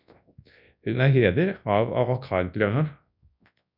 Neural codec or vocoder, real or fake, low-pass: codec, 24 kHz, 0.9 kbps, WavTokenizer, large speech release; fake; 5.4 kHz